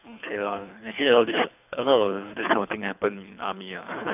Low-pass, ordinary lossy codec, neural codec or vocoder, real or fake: 3.6 kHz; none; codec, 24 kHz, 3 kbps, HILCodec; fake